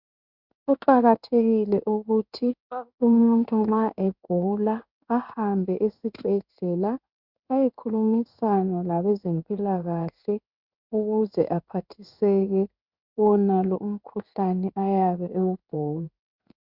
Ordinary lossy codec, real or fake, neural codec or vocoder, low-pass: Opus, 64 kbps; fake; codec, 16 kHz in and 24 kHz out, 1 kbps, XY-Tokenizer; 5.4 kHz